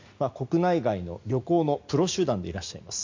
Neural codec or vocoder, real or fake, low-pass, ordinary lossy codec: none; real; 7.2 kHz; MP3, 64 kbps